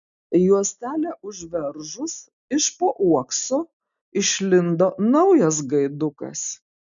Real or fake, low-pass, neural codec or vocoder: real; 7.2 kHz; none